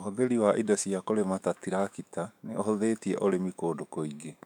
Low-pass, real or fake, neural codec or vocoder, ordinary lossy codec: 19.8 kHz; fake; autoencoder, 48 kHz, 128 numbers a frame, DAC-VAE, trained on Japanese speech; none